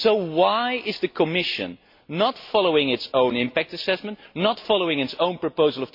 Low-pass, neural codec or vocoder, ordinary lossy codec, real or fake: 5.4 kHz; none; MP3, 32 kbps; real